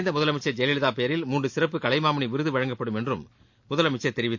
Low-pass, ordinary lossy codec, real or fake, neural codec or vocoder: 7.2 kHz; MP3, 48 kbps; real; none